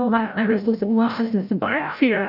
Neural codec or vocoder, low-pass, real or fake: codec, 16 kHz, 0.5 kbps, FreqCodec, larger model; 5.4 kHz; fake